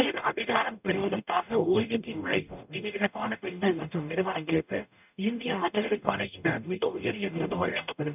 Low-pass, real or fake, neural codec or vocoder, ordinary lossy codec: 3.6 kHz; fake; codec, 44.1 kHz, 0.9 kbps, DAC; none